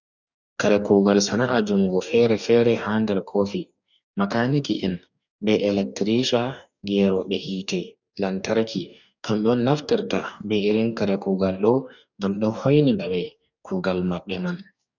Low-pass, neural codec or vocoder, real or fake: 7.2 kHz; codec, 44.1 kHz, 2.6 kbps, DAC; fake